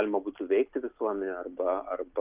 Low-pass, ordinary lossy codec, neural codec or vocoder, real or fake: 3.6 kHz; Opus, 24 kbps; none; real